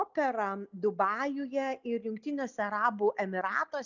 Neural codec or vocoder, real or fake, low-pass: none; real; 7.2 kHz